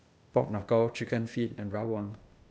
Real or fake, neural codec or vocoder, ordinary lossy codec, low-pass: fake; codec, 16 kHz, 0.8 kbps, ZipCodec; none; none